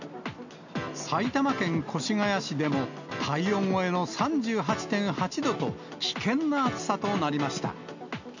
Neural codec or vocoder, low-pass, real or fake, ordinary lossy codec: none; 7.2 kHz; real; none